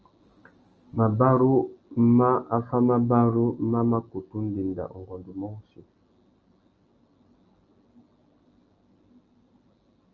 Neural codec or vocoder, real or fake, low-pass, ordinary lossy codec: none; real; 7.2 kHz; Opus, 24 kbps